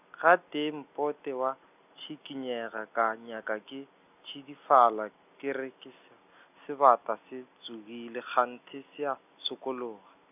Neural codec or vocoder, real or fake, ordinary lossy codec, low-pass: none; real; none; 3.6 kHz